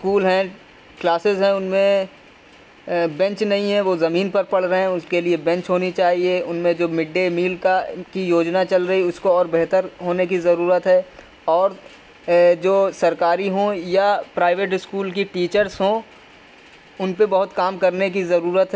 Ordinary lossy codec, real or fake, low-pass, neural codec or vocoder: none; real; none; none